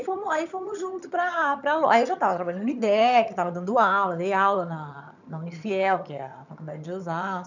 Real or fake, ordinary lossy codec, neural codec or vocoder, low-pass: fake; none; vocoder, 22.05 kHz, 80 mel bands, HiFi-GAN; 7.2 kHz